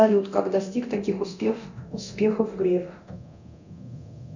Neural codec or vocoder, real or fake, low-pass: codec, 24 kHz, 0.9 kbps, DualCodec; fake; 7.2 kHz